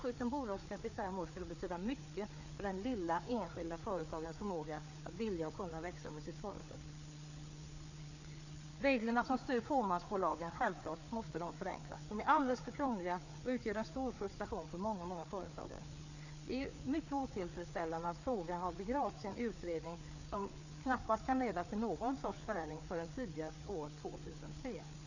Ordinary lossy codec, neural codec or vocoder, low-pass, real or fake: none; codec, 16 kHz, 4 kbps, FreqCodec, larger model; 7.2 kHz; fake